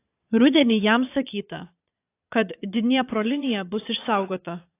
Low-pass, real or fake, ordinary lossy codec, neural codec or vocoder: 3.6 kHz; fake; AAC, 24 kbps; vocoder, 22.05 kHz, 80 mel bands, Vocos